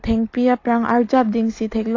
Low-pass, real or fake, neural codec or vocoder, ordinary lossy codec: 7.2 kHz; real; none; AAC, 32 kbps